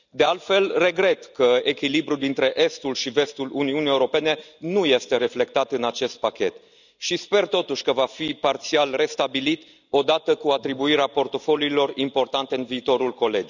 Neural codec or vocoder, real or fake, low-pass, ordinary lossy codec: none; real; 7.2 kHz; none